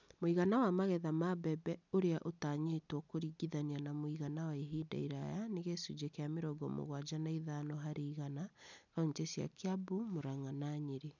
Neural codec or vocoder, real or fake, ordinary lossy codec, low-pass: none; real; none; 7.2 kHz